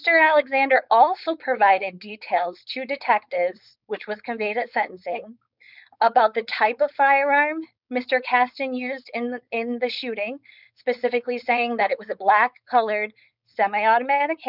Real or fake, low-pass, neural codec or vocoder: fake; 5.4 kHz; codec, 16 kHz, 4.8 kbps, FACodec